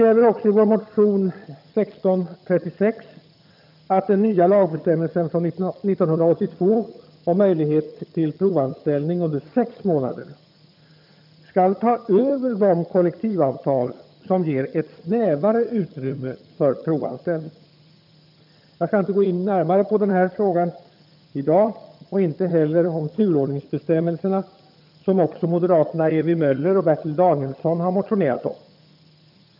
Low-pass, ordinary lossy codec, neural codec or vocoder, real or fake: 5.4 kHz; none; vocoder, 22.05 kHz, 80 mel bands, HiFi-GAN; fake